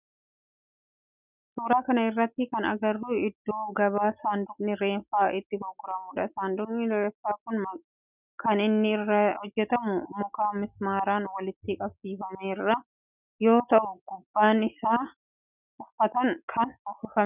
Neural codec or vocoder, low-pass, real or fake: none; 3.6 kHz; real